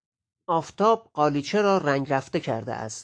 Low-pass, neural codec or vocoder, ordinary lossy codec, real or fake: 9.9 kHz; autoencoder, 48 kHz, 128 numbers a frame, DAC-VAE, trained on Japanese speech; AAC, 48 kbps; fake